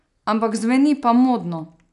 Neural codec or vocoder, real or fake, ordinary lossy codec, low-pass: none; real; none; 10.8 kHz